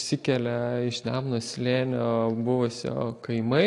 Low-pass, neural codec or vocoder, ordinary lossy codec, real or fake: 10.8 kHz; none; AAC, 48 kbps; real